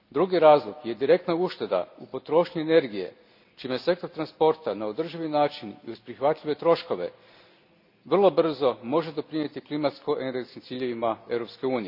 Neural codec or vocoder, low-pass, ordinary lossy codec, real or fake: none; 5.4 kHz; none; real